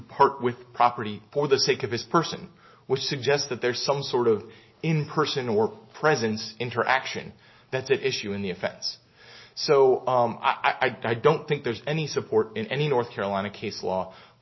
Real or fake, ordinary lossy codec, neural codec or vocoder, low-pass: real; MP3, 24 kbps; none; 7.2 kHz